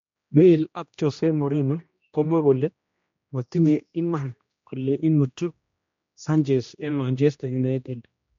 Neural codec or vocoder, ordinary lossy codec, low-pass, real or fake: codec, 16 kHz, 1 kbps, X-Codec, HuBERT features, trained on general audio; MP3, 48 kbps; 7.2 kHz; fake